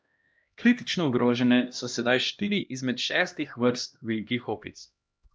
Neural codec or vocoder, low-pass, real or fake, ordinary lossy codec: codec, 16 kHz, 1 kbps, X-Codec, HuBERT features, trained on LibriSpeech; none; fake; none